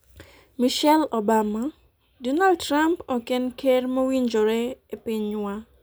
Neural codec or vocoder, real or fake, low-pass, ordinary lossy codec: none; real; none; none